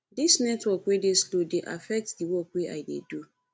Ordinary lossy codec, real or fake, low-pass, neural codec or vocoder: none; real; none; none